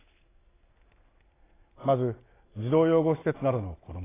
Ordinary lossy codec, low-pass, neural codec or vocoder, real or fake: AAC, 16 kbps; 3.6 kHz; none; real